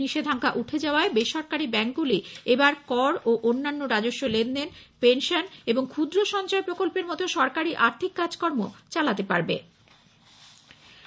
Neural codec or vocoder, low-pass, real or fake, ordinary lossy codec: none; none; real; none